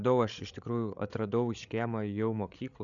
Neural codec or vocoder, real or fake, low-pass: codec, 16 kHz, 8 kbps, FreqCodec, larger model; fake; 7.2 kHz